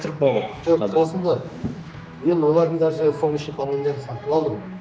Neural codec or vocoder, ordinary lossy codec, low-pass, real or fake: codec, 16 kHz, 2 kbps, X-Codec, HuBERT features, trained on general audio; none; none; fake